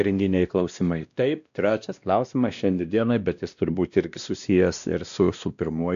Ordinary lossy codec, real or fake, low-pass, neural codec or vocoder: AAC, 96 kbps; fake; 7.2 kHz; codec, 16 kHz, 1 kbps, X-Codec, WavLM features, trained on Multilingual LibriSpeech